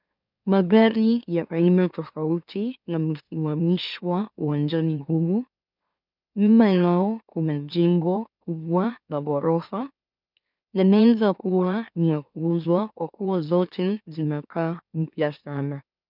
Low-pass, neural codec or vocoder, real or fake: 5.4 kHz; autoencoder, 44.1 kHz, a latent of 192 numbers a frame, MeloTTS; fake